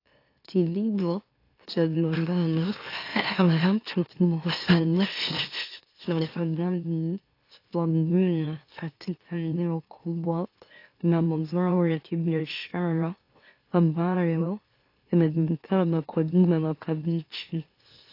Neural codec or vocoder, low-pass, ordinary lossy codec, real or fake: autoencoder, 44.1 kHz, a latent of 192 numbers a frame, MeloTTS; 5.4 kHz; AAC, 32 kbps; fake